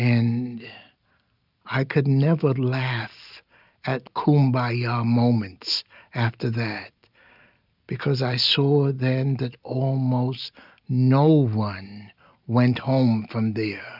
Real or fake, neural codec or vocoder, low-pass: real; none; 5.4 kHz